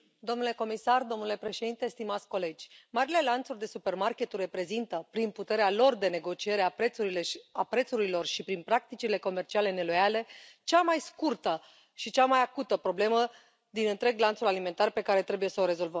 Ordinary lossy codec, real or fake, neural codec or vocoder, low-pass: none; real; none; none